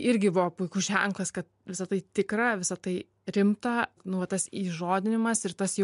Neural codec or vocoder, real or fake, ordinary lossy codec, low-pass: none; real; MP3, 64 kbps; 10.8 kHz